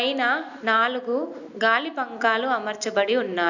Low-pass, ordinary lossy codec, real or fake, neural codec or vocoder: 7.2 kHz; none; real; none